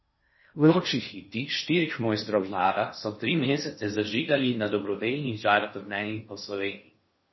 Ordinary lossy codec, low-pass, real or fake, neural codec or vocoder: MP3, 24 kbps; 7.2 kHz; fake; codec, 16 kHz in and 24 kHz out, 0.6 kbps, FocalCodec, streaming, 2048 codes